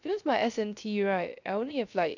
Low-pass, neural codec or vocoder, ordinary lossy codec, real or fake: 7.2 kHz; codec, 16 kHz, 0.3 kbps, FocalCodec; none; fake